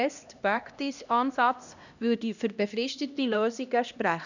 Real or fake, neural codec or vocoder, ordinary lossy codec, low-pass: fake; codec, 16 kHz, 1 kbps, X-Codec, HuBERT features, trained on LibriSpeech; none; 7.2 kHz